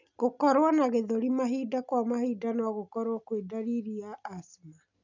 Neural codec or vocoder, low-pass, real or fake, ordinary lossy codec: none; 7.2 kHz; real; none